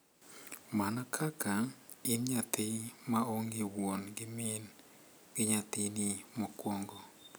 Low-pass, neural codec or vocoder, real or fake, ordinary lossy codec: none; none; real; none